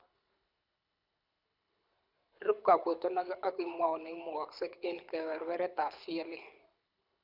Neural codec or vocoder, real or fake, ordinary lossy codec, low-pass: codec, 24 kHz, 6 kbps, HILCodec; fake; none; 5.4 kHz